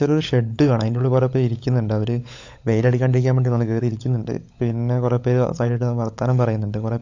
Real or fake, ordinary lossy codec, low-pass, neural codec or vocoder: fake; AAC, 48 kbps; 7.2 kHz; codec, 16 kHz, 16 kbps, FunCodec, trained on LibriTTS, 50 frames a second